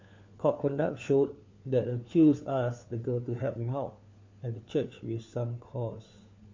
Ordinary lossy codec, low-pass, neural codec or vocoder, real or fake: MP3, 48 kbps; 7.2 kHz; codec, 16 kHz, 4 kbps, FunCodec, trained on LibriTTS, 50 frames a second; fake